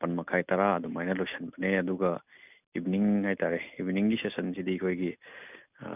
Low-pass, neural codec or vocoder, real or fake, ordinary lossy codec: 3.6 kHz; none; real; none